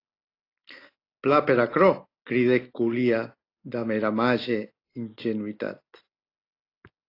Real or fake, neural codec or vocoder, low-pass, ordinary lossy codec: real; none; 5.4 kHz; AAC, 32 kbps